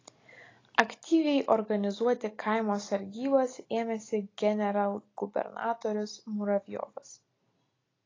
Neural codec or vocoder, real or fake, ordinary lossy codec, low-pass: none; real; AAC, 32 kbps; 7.2 kHz